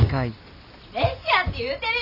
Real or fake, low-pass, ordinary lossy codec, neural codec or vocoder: real; 5.4 kHz; none; none